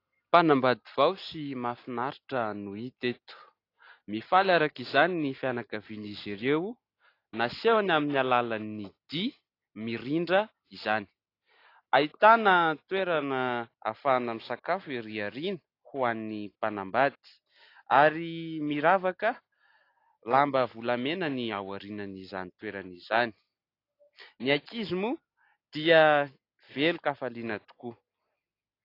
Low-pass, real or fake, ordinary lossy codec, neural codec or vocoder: 5.4 kHz; real; AAC, 32 kbps; none